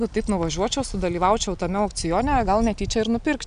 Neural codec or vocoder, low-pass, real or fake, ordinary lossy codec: none; 9.9 kHz; real; MP3, 96 kbps